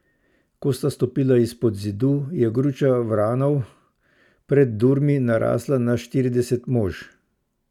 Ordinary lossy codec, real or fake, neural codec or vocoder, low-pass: none; real; none; 19.8 kHz